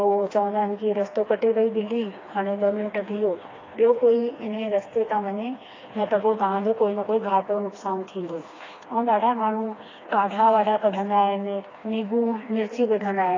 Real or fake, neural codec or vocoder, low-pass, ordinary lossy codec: fake; codec, 16 kHz, 2 kbps, FreqCodec, smaller model; 7.2 kHz; AAC, 32 kbps